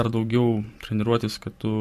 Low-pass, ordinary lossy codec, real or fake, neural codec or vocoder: 14.4 kHz; MP3, 64 kbps; fake; vocoder, 44.1 kHz, 128 mel bands every 512 samples, BigVGAN v2